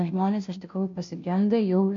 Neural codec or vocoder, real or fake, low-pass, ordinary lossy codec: codec, 16 kHz, 0.5 kbps, FunCodec, trained on Chinese and English, 25 frames a second; fake; 7.2 kHz; AAC, 64 kbps